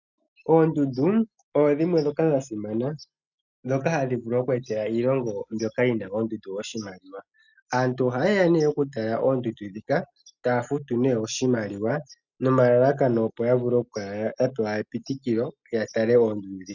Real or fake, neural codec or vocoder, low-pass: real; none; 7.2 kHz